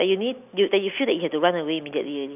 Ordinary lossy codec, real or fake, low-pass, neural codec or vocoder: none; real; 3.6 kHz; none